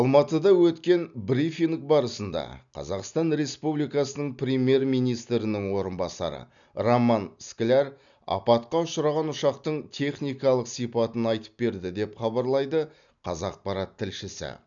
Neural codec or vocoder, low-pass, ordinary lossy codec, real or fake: none; 7.2 kHz; none; real